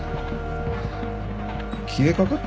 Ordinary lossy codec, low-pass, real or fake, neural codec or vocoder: none; none; real; none